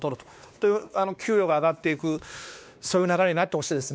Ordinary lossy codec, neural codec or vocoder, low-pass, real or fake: none; codec, 16 kHz, 2 kbps, X-Codec, WavLM features, trained on Multilingual LibriSpeech; none; fake